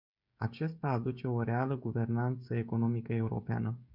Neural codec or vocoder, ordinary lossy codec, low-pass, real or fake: codec, 16 kHz, 16 kbps, FreqCodec, smaller model; MP3, 48 kbps; 5.4 kHz; fake